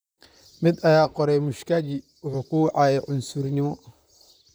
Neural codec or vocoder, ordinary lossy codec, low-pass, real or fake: vocoder, 44.1 kHz, 128 mel bands, Pupu-Vocoder; none; none; fake